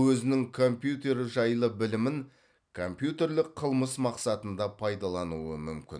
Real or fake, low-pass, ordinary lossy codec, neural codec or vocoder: real; 9.9 kHz; none; none